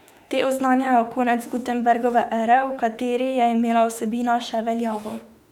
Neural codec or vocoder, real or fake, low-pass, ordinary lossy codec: autoencoder, 48 kHz, 32 numbers a frame, DAC-VAE, trained on Japanese speech; fake; 19.8 kHz; none